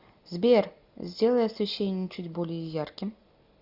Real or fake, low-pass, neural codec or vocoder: real; 5.4 kHz; none